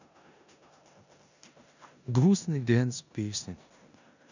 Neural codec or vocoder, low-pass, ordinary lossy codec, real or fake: codec, 16 kHz in and 24 kHz out, 0.9 kbps, LongCat-Audio-Codec, four codebook decoder; 7.2 kHz; none; fake